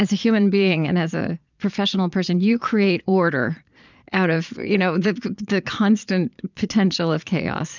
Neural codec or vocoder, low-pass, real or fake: vocoder, 22.05 kHz, 80 mel bands, Vocos; 7.2 kHz; fake